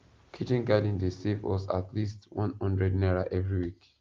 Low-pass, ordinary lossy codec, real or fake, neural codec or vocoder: 7.2 kHz; Opus, 32 kbps; real; none